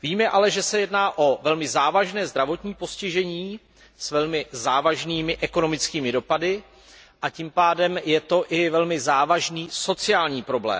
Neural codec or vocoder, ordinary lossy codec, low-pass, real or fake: none; none; none; real